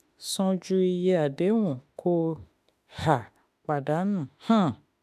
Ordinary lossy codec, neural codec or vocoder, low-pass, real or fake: AAC, 96 kbps; autoencoder, 48 kHz, 32 numbers a frame, DAC-VAE, trained on Japanese speech; 14.4 kHz; fake